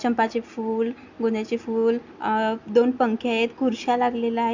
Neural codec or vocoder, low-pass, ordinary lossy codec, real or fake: none; 7.2 kHz; MP3, 64 kbps; real